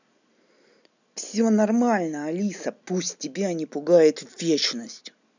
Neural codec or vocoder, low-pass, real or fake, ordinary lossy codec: none; 7.2 kHz; real; none